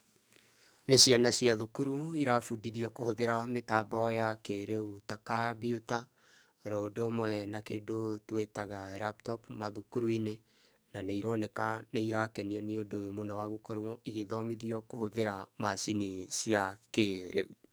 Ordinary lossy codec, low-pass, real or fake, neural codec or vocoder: none; none; fake; codec, 44.1 kHz, 2.6 kbps, SNAC